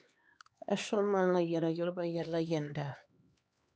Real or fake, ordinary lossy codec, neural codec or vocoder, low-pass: fake; none; codec, 16 kHz, 2 kbps, X-Codec, HuBERT features, trained on LibriSpeech; none